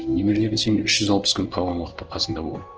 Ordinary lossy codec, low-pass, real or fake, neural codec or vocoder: Opus, 16 kbps; 7.2 kHz; fake; codec, 16 kHz in and 24 kHz out, 1 kbps, XY-Tokenizer